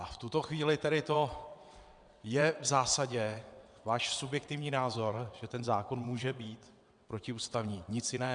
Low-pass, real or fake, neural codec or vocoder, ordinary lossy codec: 9.9 kHz; fake; vocoder, 22.05 kHz, 80 mel bands, WaveNeXt; MP3, 96 kbps